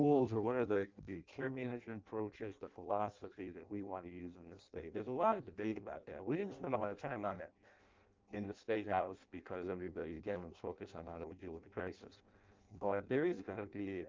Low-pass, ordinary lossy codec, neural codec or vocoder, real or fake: 7.2 kHz; Opus, 32 kbps; codec, 16 kHz in and 24 kHz out, 0.6 kbps, FireRedTTS-2 codec; fake